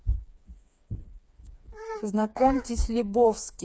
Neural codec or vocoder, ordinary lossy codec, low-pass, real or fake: codec, 16 kHz, 4 kbps, FreqCodec, smaller model; none; none; fake